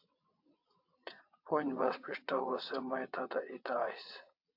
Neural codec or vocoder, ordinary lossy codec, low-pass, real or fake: vocoder, 22.05 kHz, 80 mel bands, WaveNeXt; AAC, 48 kbps; 5.4 kHz; fake